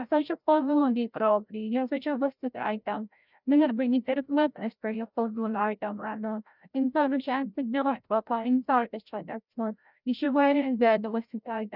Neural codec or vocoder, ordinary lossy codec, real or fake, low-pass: codec, 16 kHz, 0.5 kbps, FreqCodec, larger model; none; fake; 5.4 kHz